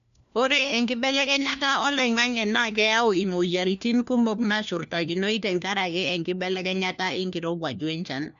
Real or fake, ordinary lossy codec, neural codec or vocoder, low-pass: fake; none; codec, 16 kHz, 1 kbps, FunCodec, trained on LibriTTS, 50 frames a second; 7.2 kHz